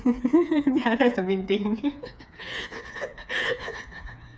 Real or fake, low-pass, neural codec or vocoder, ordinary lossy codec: fake; none; codec, 16 kHz, 4 kbps, FreqCodec, smaller model; none